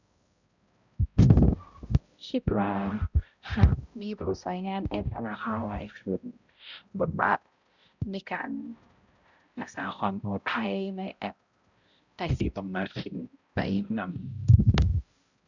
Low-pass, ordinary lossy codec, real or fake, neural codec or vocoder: 7.2 kHz; Opus, 64 kbps; fake; codec, 16 kHz, 0.5 kbps, X-Codec, HuBERT features, trained on balanced general audio